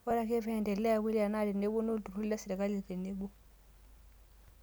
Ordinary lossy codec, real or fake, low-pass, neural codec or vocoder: none; real; none; none